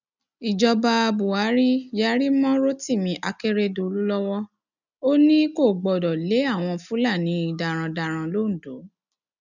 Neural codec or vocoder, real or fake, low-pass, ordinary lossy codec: none; real; 7.2 kHz; none